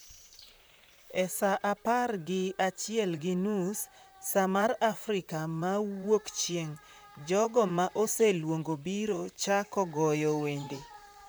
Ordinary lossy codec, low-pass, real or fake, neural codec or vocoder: none; none; fake; vocoder, 44.1 kHz, 128 mel bands, Pupu-Vocoder